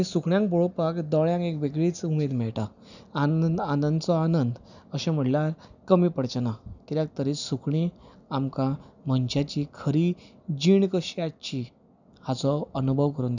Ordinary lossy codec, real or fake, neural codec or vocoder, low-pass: none; real; none; 7.2 kHz